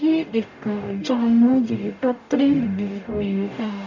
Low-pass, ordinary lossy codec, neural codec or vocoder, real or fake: 7.2 kHz; none; codec, 44.1 kHz, 0.9 kbps, DAC; fake